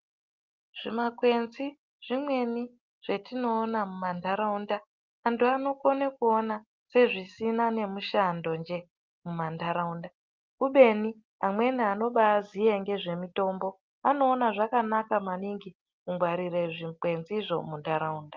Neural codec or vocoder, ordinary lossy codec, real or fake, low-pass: none; Opus, 24 kbps; real; 7.2 kHz